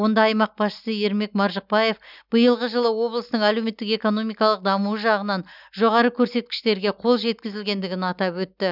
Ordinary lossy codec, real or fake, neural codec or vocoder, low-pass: none; real; none; 5.4 kHz